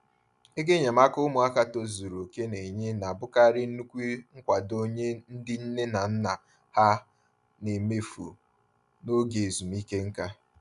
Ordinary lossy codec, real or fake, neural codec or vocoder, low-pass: none; real; none; 10.8 kHz